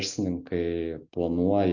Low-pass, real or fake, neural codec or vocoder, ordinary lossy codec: 7.2 kHz; real; none; Opus, 64 kbps